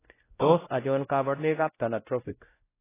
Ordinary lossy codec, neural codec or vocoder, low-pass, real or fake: AAC, 16 kbps; codec, 16 kHz, 0.5 kbps, X-Codec, WavLM features, trained on Multilingual LibriSpeech; 3.6 kHz; fake